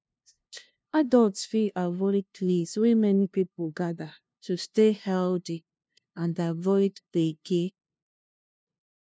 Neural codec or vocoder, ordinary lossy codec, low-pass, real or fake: codec, 16 kHz, 0.5 kbps, FunCodec, trained on LibriTTS, 25 frames a second; none; none; fake